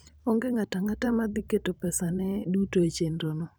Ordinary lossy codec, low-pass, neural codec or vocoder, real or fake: none; none; vocoder, 44.1 kHz, 128 mel bands every 512 samples, BigVGAN v2; fake